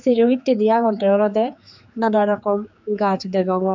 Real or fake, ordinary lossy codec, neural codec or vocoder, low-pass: fake; none; codec, 16 kHz, 4 kbps, X-Codec, HuBERT features, trained on general audio; 7.2 kHz